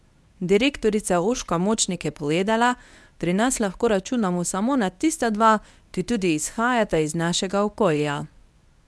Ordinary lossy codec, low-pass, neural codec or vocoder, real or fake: none; none; codec, 24 kHz, 0.9 kbps, WavTokenizer, medium speech release version 1; fake